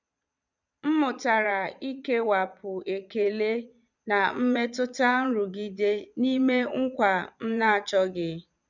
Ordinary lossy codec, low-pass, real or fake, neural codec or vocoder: none; 7.2 kHz; fake; vocoder, 22.05 kHz, 80 mel bands, Vocos